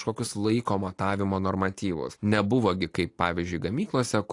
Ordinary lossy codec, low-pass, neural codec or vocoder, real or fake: AAC, 48 kbps; 10.8 kHz; none; real